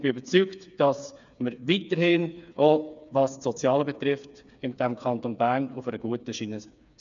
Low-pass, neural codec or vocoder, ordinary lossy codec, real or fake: 7.2 kHz; codec, 16 kHz, 4 kbps, FreqCodec, smaller model; none; fake